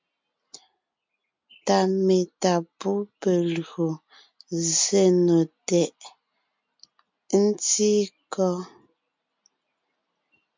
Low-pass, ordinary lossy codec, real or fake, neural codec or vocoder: 7.2 kHz; MP3, 64 kbps; real; none